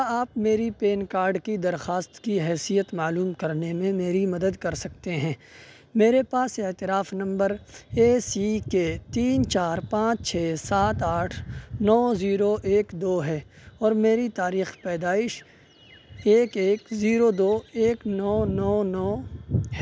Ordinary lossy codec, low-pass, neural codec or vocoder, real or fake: none; none; none; real